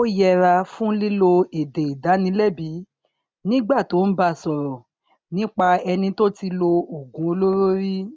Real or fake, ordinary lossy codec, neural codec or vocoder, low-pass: real; none; none; none